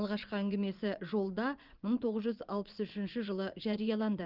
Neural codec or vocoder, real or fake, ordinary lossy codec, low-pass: vocoder, 24 kHz, 100 mel bands, Vocos; fake; Opus, 32 kbps; 5.4 kHz